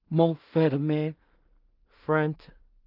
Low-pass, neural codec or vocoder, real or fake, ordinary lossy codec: 5.4 kHz; codec, 16 kHz in and 24 kHz out, 0.4 kbps, LongCat-Audio-Codec, two codebook decoder; fake; Opus, 32 kbps